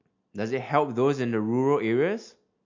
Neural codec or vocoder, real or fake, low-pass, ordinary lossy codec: none; real; 7.2 kHz; MP3, 48 kbps